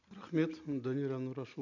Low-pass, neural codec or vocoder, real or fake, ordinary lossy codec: 7.2 kHz; none; real; none